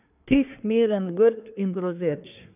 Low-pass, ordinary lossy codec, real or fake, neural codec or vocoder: 3.6 kHz; none; fake; codec, 24 kHz, 1 kbps, SNAC